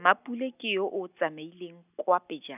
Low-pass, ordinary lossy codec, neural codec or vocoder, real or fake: 3.6 kHz; none; none; real